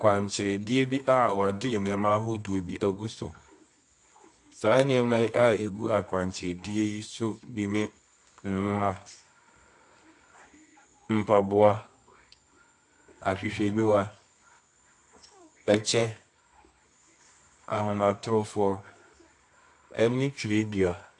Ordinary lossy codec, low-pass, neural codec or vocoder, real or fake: AAC, 64 kbps; 10.8 kHz; codec, 24 kHz, 0.9 kbps, WavTokenizer, medium music audio release; fake